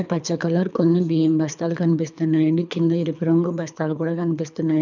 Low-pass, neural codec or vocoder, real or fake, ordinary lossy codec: 7.2 kHz; codec, 24 kHz, 3 kbps, HILCodec; fake; none